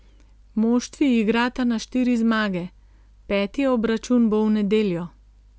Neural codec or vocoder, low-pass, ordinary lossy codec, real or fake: none; none; none; real